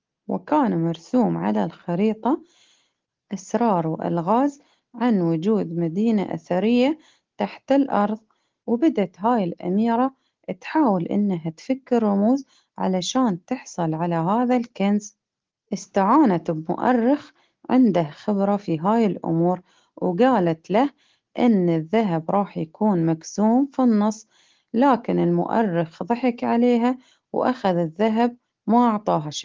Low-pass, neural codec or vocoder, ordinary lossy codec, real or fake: 7.2 kHz; none; Opus, 16 kbps; real